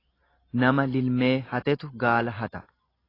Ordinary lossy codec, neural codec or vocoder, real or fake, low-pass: AAC, 24 kbps; none; real; 5.4 kHz